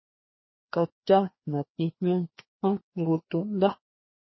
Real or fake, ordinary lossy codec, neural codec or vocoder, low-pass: fake; MP3, 24 kbps; codec, 16 kHz, 2 kbps, FreqCodec, larger model; 7.2 kHz